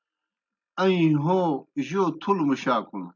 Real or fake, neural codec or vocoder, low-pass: real; none; 7.2 kHz